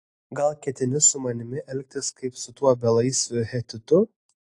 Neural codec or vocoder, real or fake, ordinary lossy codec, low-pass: none; real; AAC, 48 kbps; 10.8 kHz